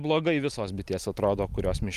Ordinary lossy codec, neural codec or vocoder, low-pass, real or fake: Opus, 32 kbps; none; 14.4 kHz; real